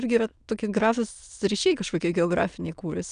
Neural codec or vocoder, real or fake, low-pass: autoencoder, 22.05 kHz, a latent of 192 numbers a frame, VITS, trained on many speakers; fake; 9.9 kHz